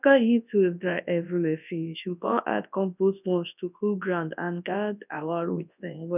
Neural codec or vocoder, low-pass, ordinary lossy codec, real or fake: codec, 24 kHz, 0.9 kbps, WavTokenizer, large speech release; 3.6 kHz; none; fake